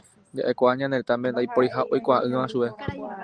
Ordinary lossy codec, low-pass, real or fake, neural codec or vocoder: Opus, 24 kbps; 9.9 kHz; real; none